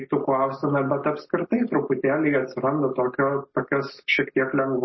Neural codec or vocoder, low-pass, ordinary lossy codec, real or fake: none; 7.2 kHz; MP3, 24 kbps; real